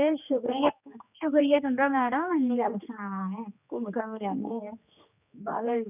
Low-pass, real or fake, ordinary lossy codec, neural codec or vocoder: 3.6 kHz; fake; none; codec, 16 kHz, 2 kbps, X-Codec, HuBERT features, trained on general audio